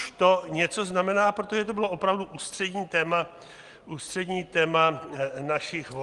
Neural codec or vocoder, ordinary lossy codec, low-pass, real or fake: none; Opus, 24 kbps; 10.8 kHz; real